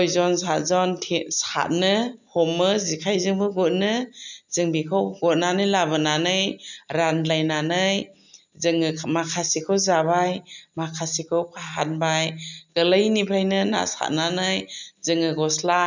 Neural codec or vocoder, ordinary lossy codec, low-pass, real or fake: none; none; 7.2 kHz; real